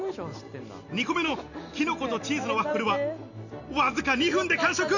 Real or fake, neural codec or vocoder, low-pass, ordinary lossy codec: real; none; 7.2 kHz; none